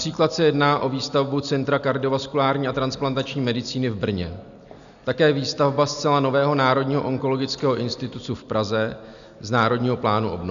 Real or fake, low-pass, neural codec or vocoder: real; 7.2 kHz; none